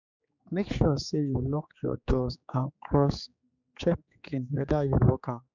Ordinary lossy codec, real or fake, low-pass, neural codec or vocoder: none; fake; 7.2 kHz; codec, 16 kHz, 4 kbps, X-Codec, HuBERT features, trained on general audio